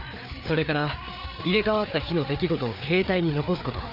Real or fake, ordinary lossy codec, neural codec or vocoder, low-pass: fake; none; codec, 16 kHz, 4 kbps, FreqCodec, larger model; 5.4 kHz